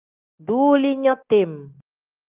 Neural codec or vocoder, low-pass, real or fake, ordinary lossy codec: none; 3.6 kHz; real; Opus, 32 kbps